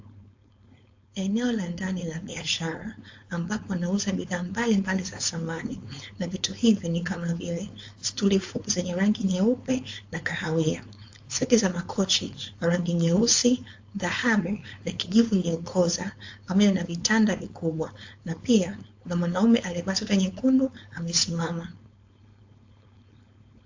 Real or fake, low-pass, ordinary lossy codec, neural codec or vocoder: fake; 7.2 kHz; AAC, 48 kbps; codec, 16 kHz, 4.8 kbps, FACodec